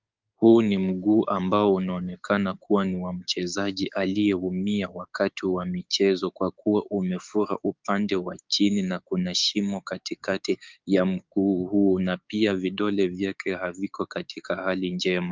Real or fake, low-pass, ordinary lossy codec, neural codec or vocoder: fake; 7.2 kHz; Opus, 32 kbps; codec, 16 kHz, 6 kbps, DAC